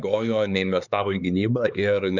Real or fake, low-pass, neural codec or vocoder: fake; 7.2 kHz; codec, 16 kHz, 4 kbps, X-Codec, HuBERT features, trained on LibriSpeech